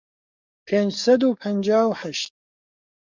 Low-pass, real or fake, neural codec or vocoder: 7.2 kHz; fake; codec, 44.1 kHz, 7.8 kbps, DAC